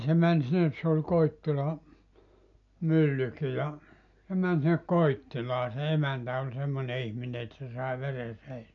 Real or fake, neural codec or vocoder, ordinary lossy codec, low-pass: real; none; none; 7.2 kHz